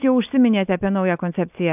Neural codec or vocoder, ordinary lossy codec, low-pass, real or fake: none; AAC, 32 kbps; 3.6 kHz; real